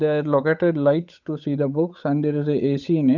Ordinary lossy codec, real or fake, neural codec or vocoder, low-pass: none; fake; codec, 16 kHz, 8 kbps, FunCodec, trained on Chinese and English, 25 frames a second; 7.2 kHz